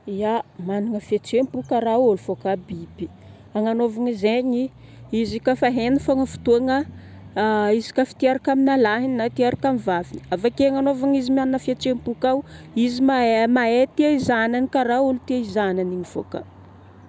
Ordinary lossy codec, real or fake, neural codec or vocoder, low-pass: none; real; none; none